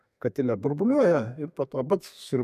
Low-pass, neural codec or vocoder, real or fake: 14.4 kHz; codec, 32 kHz, 1.9 kbps, SNAC; fake